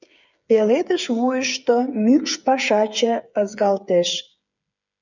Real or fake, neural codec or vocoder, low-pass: fake; codec, 16 kHz, 8 kbps, FreqCodec, smaller model; 7.2 kHz